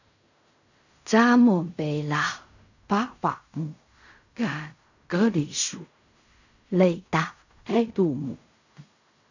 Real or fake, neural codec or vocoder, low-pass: fake; codec, 16 kHz in and 24 kHz out, 0.4 kbps, LongCat-Audio-Codec, fine tuned four codebook decoder; 7.2 kHz